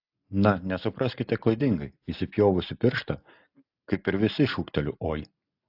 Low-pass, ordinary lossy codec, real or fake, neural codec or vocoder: 5.4 kHz; AAC, 48 kbps; real; none